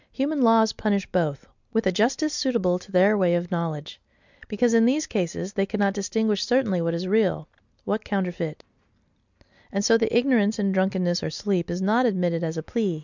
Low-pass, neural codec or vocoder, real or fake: 7.2 kHz; none; real